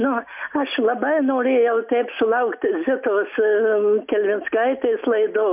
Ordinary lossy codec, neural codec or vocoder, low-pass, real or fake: MP3, 32 kbps; none; 3.6 kHz; real